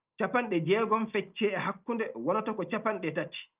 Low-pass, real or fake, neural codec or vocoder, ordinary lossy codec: 3.6 kHz; real; none; Opus, 24 kbps